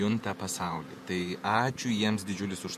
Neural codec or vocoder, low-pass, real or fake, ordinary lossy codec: vocoder, 48 kHz, 128 mel bands, Vocos; 14.4 kHz; fake; MP3, 64 kbps